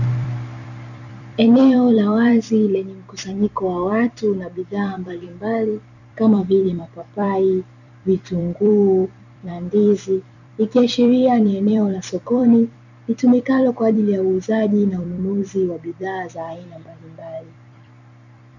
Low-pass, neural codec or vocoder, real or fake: 7.2 kHz; vocoder, 44.1 kHz, 128 mel bands every 256 samples, BigVGAN v2; fake